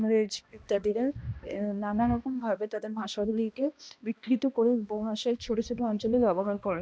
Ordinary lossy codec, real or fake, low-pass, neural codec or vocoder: none; fake; none; codec, 16 kHz, 1 kbps, X-Codec, HuBERT features, trained on balanced general audio